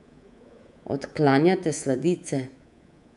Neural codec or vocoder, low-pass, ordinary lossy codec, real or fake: codec, 24 kHz, 3.1 kbps, DualCodec; 10.8 kHz; none; fake